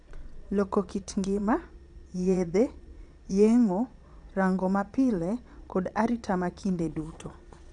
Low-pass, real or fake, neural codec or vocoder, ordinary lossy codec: 9.9 kHz; fake; vocoder, 22.05 kHz, 80 mel bands, Vocos; none